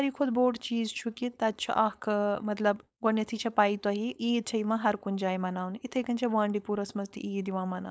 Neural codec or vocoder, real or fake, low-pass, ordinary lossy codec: codec, 16 kHz, 4.8 kbps, FACodec; fake; none; none